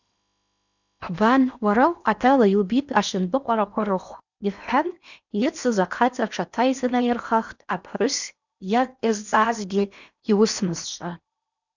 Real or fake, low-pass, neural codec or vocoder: fake; 7.2 kHz; codec, 16 kHz in and 24 kHz out, 0.8 kbps, FocalCodec, streaming, 65536 codes